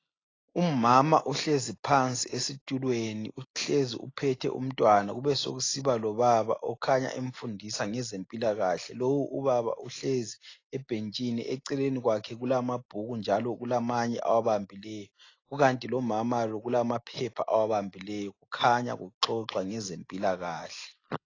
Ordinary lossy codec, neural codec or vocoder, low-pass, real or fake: AAC, 32 kbps; none; 7.2 kHz; real